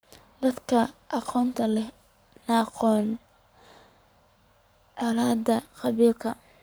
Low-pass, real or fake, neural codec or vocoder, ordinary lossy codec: none; fake; codec, 44.1 kHz, 7.8 kbps, Pupu-Codec; none